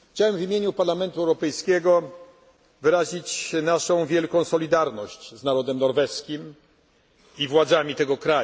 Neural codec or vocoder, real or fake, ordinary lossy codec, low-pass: none; real; none; none